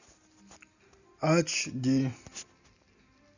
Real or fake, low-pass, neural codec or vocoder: real; 7.2 kHz; none